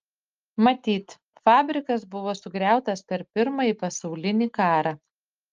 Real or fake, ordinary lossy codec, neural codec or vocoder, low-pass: real; Opus, 24 kbps; none; 7.2 kHz